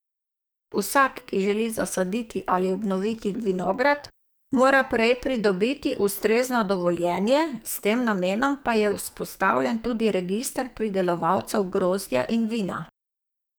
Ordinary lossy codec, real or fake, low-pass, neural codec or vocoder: none; fake; none; codec, 44.1 kHz, 2.6 kbps, SNAC